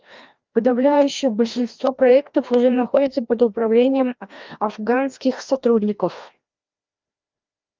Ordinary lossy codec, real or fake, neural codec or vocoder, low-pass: Opus, 24 kbps; fake; codec, 16 kHz, 1 kbps, FreqCodec, larger model; 7.2 kHz